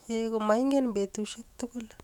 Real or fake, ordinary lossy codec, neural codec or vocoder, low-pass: fake; none; autoencoder, 48 kHz, 128 numbers a frame, DAC-VAE, trained on Japanese speech; 19.8 kHz